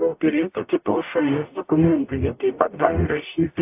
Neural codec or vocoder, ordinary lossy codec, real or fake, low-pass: codec, 44.1 kHz, 0.9 kbps, DAC; AAC, 32 kbps; fake; 3.6 kHz